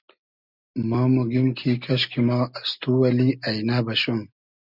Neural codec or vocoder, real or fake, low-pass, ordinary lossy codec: none; real; 5.4 kHz; Opus, 64 kbps